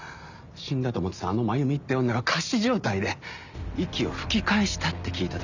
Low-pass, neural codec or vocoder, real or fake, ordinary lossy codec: 7.2 kHz; none; real; none